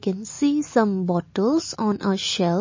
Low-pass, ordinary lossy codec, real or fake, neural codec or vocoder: 7.2 kHz; MP3, 32 kbps; real; none